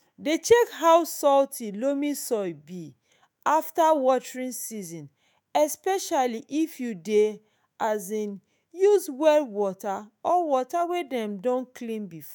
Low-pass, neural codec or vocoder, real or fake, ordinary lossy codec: none; autoencoder, 48 kHz, 128 numbers a frame, DAC-VAE, trained on Japanese speech; fake; none